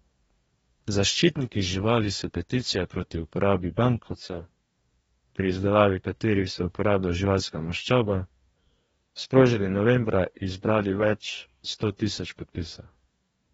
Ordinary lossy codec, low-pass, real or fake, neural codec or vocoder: AAC, 24 kbps; 19.8 kHz; fake; codec, 44.1 kHz, 2.6 kbps, DAC